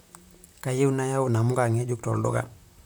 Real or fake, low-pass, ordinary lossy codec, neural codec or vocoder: fake; none; none; vocoder, 44.1 kHz, 128 mel bands, Pupu-Vocoder